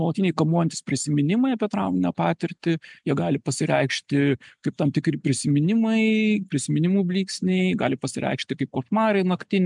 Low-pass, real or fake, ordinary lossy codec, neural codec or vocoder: 10.8 kHz; real; MP3, 96 kbps; none